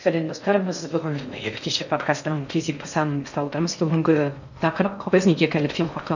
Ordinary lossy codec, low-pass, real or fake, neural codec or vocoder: none; 7.2 kHz; fake; codec, 16 kHz in and 24 kHz out, 0.6 kbps, FocalCodec, streaming, 4096 codes